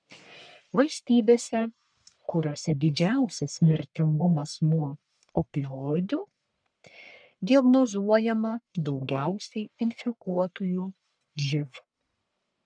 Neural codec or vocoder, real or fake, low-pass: codec, 44.1 kHz, 1.7 kbps, Pupu-Codec; fake; 9.9 kHz